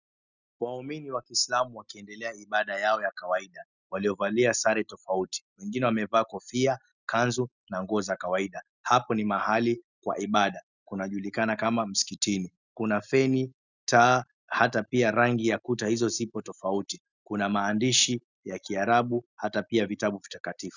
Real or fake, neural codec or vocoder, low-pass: real; none; 7.2 kHz